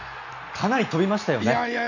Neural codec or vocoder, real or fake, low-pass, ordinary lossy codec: none; real; 7.2 kHz; none